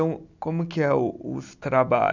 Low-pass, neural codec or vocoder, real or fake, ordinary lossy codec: 7.2 kHz; none; real; none